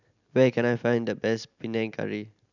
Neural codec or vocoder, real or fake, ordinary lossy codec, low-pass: none; real; none; 7.2 kHz